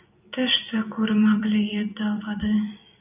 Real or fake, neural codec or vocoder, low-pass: fake; vocoder, 44.1 kHz, 128 mel bands every 256 samples, BigVGAN v2; 3.6 kHz